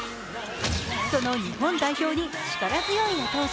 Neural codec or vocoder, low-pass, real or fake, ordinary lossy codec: none; none; real; none